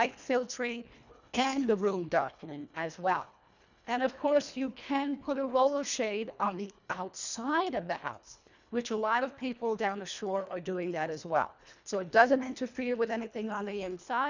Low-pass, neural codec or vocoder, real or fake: 7.2 kHz; codec, 24 kHz, 1.5 kbps, HILCodec; fake